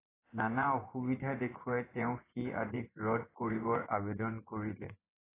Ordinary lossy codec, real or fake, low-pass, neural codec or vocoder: AAC, 16 kbps; fake; 3.6 kHz; vocoder, 24 kHz, 100 mel bands, Vocos